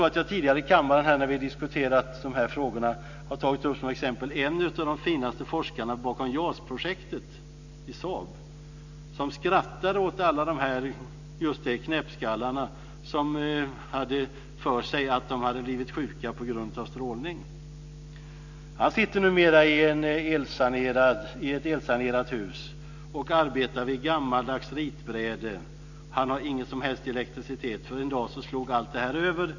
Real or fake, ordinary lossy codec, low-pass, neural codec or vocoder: real; none; 7.2 kHz; none